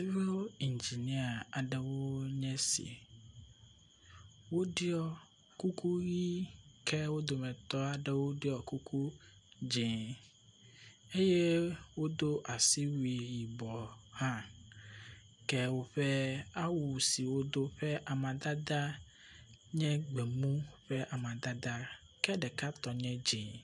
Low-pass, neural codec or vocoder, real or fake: 10.8 kHz; none; real